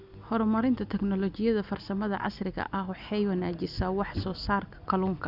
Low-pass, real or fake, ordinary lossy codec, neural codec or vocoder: 5.4 kHz; real; none; none